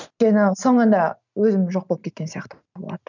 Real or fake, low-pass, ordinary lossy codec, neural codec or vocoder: real; 7.2 kHz; none; none